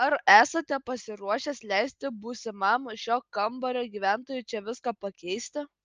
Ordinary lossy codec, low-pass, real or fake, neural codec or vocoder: Opus, 24 kbps; 7.2 kHz; real; none